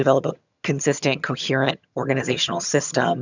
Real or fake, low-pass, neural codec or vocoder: fake; 7.2 kHz; vocoder, 22.05 kHz, 80 mel bands, HiFi-GAN